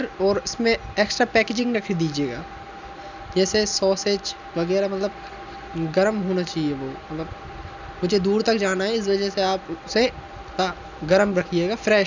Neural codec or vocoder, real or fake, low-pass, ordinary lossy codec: none; real; 7.2 kHz; none